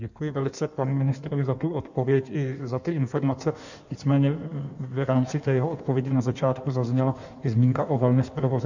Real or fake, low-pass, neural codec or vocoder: fake; 7.2 kHz; codec, 16 kHz in and 24 kHz out, 1.1 kbps, FireRedTTS-2 codec